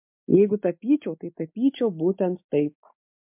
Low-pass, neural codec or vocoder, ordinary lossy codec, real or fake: 3.6 kHz; none; MP3, 32 kbps; real